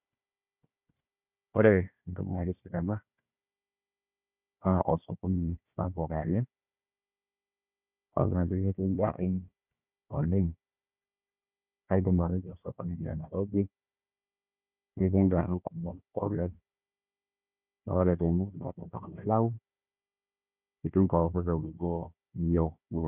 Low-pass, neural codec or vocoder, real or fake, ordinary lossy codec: 3.6 kHz; codec, 16 kHz, 1 kbps, FunCodec, trained on Chinese and English, 50 frames a second; fake; none